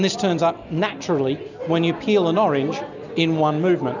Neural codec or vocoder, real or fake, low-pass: none; real; 7.2 kHz